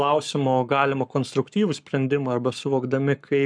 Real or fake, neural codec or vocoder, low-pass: fake; codec, 44.1 kHz, 7.8 kbps, Pupu-Codec; 9.9 kHz